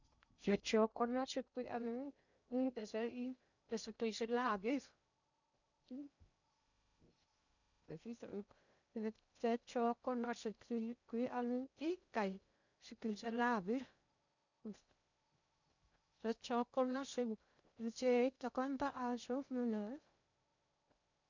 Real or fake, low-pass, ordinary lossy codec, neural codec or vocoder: fake; 7.2 kHz; none; codec, 16 kHz in and 24 kHz out, 0.6 kbps, FocalCodec, streaming, 2048 codes